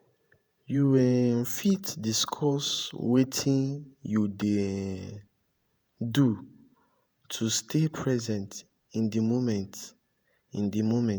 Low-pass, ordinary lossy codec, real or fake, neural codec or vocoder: none; none; fake; vocoder, 48 kHz, 128 mel bands, Vocos